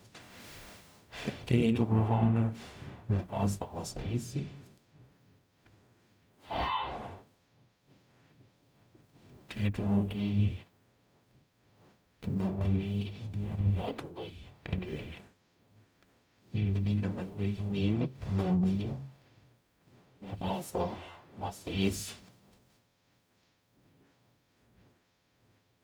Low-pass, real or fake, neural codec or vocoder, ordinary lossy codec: none; fake; codec, 44.1 kHz, 0.9 kbps, DAC; none